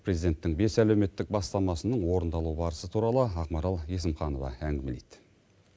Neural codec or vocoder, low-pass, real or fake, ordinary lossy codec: none; none; real; none